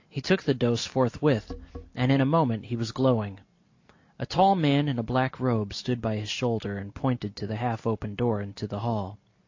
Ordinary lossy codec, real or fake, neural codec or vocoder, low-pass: AAC, 48 kbps; real; none; 7.2 kHz